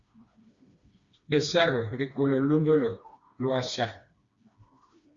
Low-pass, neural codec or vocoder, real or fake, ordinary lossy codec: 7.2 kHz; codec, 16 kHz, 2 kbps, FreqCodec, smaller model; fake; Opus, 64 kbps